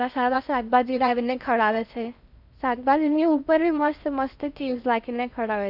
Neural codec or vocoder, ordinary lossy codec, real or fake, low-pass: codec, 16 kHz in and 24 kHz out, 0.6 kbps, FocalCodec, streaming, 4096 codes; none; fake; 5.4 kHz